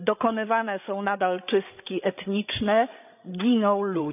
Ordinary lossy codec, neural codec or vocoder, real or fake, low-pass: none; codec, 16 kHz, 16 kbps, FreqCodec, larger model; fake; 3.6 kHz